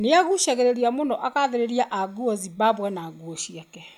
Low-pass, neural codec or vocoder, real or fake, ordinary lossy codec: 19.8 kHz; none; real; none